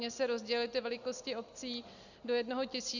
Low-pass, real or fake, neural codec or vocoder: 7.2 kHz; real; none